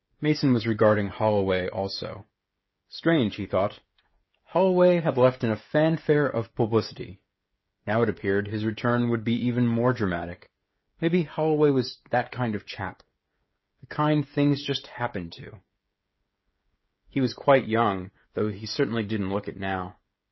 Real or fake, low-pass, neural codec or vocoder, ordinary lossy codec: fake; 7.2 kHz; codec, 16 kHz, 16 kbps, FreqCodec, smaller model; MP3, 24 kbps